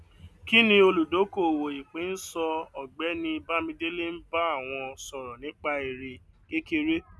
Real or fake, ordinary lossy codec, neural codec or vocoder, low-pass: real; none; none; none